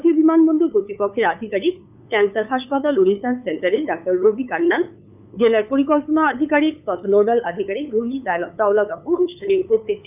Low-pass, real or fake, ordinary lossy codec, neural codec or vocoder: 3.6 kHz; fake; none; codec, 16 kHz, 8 kbps, FunCodec, trained on LibriTTS, 25 frames a second